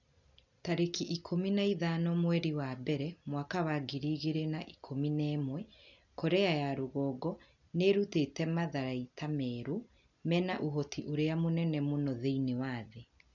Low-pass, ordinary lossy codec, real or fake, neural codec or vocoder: 7.2 kHz; none; real; none